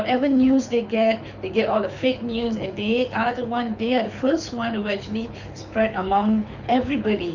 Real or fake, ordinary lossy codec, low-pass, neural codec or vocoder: fake; none; 7.2 kHz; codec, 24 kHz, 6 kbps, HILCodec